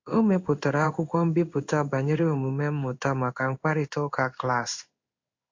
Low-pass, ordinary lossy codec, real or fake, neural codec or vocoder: 7.2 kHz; MP3, 48 kbps; fake; codec, 16 kHz in and 24 kHz out, 1 kbps, XY-Tokenizer